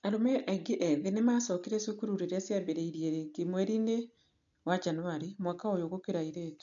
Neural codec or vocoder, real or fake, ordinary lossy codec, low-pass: none; real; MP3, 64 kbps; 7.2 kHz